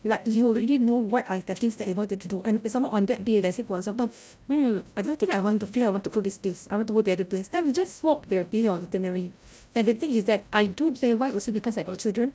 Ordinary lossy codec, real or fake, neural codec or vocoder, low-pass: none; fake; codec, 16 kHz, 0.5 kbps, FreqCodec, larger model; none